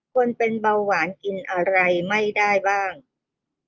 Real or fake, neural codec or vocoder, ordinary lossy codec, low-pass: real; none; Opus, 32 kbps; 7.2 kHz